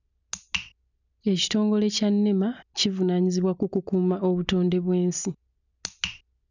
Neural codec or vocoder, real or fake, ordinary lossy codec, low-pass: none; real; none; 7.2 kHz